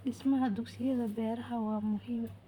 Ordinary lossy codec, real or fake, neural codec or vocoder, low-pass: none; fake; vocoder, 44.1 kHz, 128 mel bands, Pupu-Vocoder; 19.8 kHz